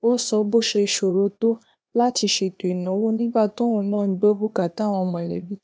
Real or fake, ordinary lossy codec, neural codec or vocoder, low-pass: fake; none; codec, 16 kHz, 0.8 kbps, ZipCodec; none